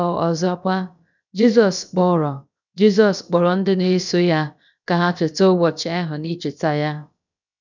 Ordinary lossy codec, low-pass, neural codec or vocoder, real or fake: none; 7.2 kHz; codec, 16 kHz, about 1 kbps, DyCAST, with the encoder's durations; fake